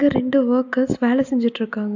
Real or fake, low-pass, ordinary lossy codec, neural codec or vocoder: real; 7.2 kHz; AAC, 48 kbps; none